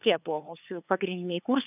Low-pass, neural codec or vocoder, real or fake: 3.6 kHz; codec, 16 kHz, 2 kbps, FunCodec, trained on LibriTTS, 25 frames a second; fake